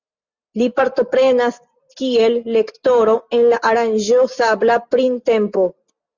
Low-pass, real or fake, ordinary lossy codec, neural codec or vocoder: 7.2 kHz; real; Opus, 64 kbps; none